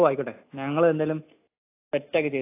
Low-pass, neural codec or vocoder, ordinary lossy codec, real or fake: 3.6 kHz; none; AAC, 24 kbps; real